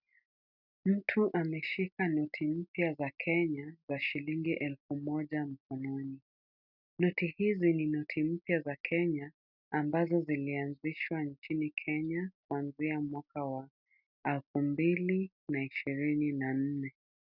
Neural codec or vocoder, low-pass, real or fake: none; 5.4 kHz; real